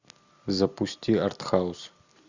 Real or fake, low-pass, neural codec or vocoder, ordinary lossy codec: real; 7.2 kHz; none; Opus, 64 kbps